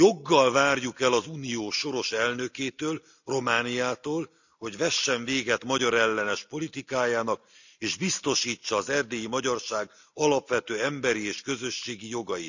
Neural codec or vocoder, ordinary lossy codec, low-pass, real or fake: none; none; 7.2 kHz; real